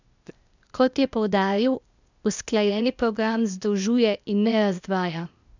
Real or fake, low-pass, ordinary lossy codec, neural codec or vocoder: fake; 7.2 kHz; none; codec, 16 kHz, 0.8 kbps, ZipCodec